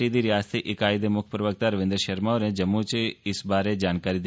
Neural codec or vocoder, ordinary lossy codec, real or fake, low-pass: none; none; real; none